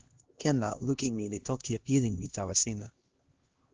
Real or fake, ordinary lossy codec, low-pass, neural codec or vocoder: fake; Opus, 16 kbps; 7.2 kHz; codec, 16 kHz, 1 kbps, X-Codec, HuBERT features, trained on LibriSpeech